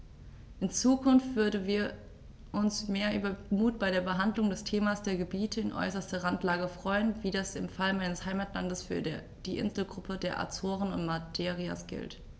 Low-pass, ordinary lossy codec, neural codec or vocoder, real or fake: none; none; none; real